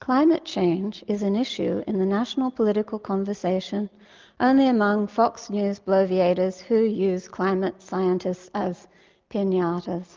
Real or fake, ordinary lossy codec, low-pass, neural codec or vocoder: real; Opus, 24 kbps; 7.2 kHz; none